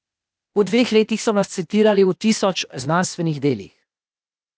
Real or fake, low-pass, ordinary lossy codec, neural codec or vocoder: fake; none; none; codec, 16 kHz, 0.8 kbps, ZipCodec